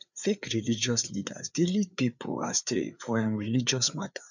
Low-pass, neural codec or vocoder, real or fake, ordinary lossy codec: 7.2 kHz; codec, 16 kHz, 4 kbps, FreqCodec, larger model; fake; none